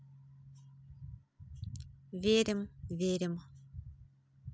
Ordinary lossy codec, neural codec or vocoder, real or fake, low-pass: none; none; real; none